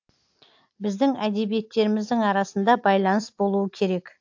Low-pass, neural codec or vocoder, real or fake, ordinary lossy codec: 7.2 kHz; none; real; AAC, 48 kbps